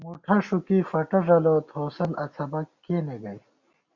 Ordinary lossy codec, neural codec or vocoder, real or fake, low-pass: Opus, 64 kbps; none; real; 7.2 kHz